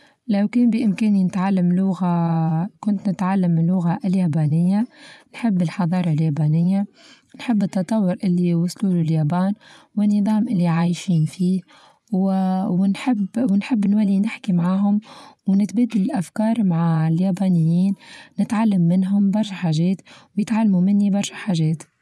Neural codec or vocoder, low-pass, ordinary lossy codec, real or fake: vocoder, 24 kHz, 100 mel bands, Vocos; none; none; fake